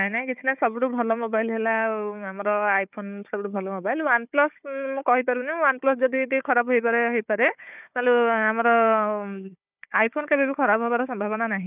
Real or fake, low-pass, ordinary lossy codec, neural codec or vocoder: fake; 3.6 kHz; none; codec, 16 kHz, 4 kbps, FunCodec, trained on Chinese and English, 50 frames a second